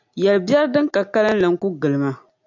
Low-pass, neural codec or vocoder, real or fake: 7.2 kHz; none; real